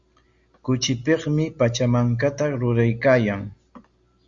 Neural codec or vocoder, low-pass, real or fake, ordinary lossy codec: none; 7.2 kHz; real; Opus, 64 kbps